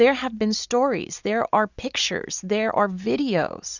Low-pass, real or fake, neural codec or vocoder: 7.2 kHz; real; none